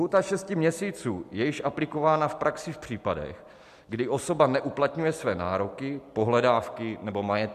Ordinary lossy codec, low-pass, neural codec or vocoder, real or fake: MP3, 96 kbps; 14.4 kHz; none; real